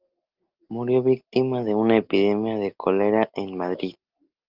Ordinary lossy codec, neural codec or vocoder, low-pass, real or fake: Opus, 32 kbps; none; 5.4 kHz; real